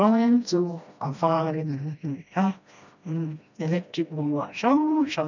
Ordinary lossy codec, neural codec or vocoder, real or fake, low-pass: none; codec, 16 kHz, 1 kbps, FreqCodec, smaller model; fake; 7.2 kHz